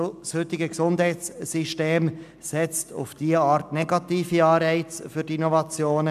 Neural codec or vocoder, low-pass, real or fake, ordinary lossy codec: none; 14.4 kHz; real; none